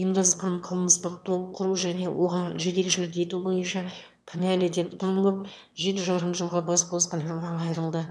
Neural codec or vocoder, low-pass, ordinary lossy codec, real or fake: autoencoder, 22.05 kHz, a latent of 192 numbers a frame, VITS, trained on one speaker; none; none; fake